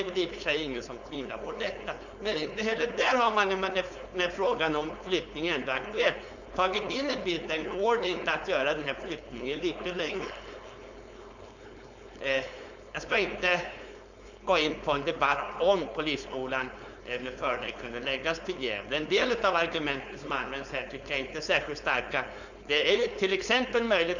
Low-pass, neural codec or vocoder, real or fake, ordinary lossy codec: 7.2 kHz; codec, 16 kHz, 4.8 kbps, FACodec; fake; none